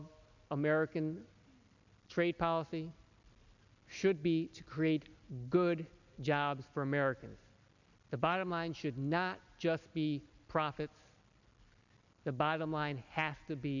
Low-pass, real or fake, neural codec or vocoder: 7.2 kHz; real; none